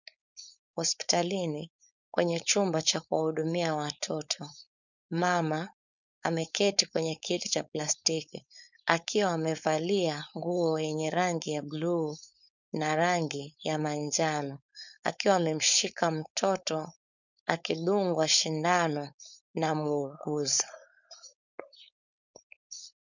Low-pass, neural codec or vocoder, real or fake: 7.2 kHz; codec, 16 kHz, 4.8 kbps, FACodec; fake